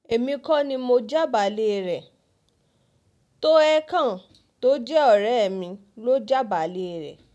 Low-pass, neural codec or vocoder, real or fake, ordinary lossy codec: none; none; real; none